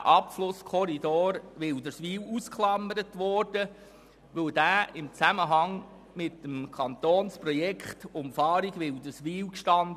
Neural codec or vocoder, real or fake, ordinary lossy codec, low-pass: none; real; none; 14.4 kHz